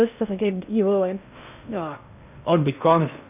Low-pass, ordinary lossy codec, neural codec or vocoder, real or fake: 3.6 kHz; none; codec, 16 kHz in and 24 kHz out, 0.6 kbps, FocalCodec, streaming, 2048 codes; fake